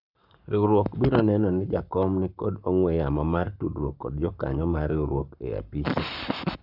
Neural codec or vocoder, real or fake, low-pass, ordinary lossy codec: codec, 44.1 kHz, 7.8 kbps, Pupu-Codec; fake; 5.4 kHz; none